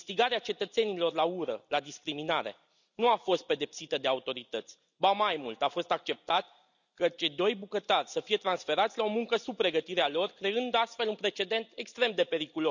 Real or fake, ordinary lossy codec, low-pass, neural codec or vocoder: real; none; 7.2 kHz; none